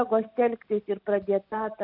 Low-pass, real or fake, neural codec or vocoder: 5.4 kHz; real; none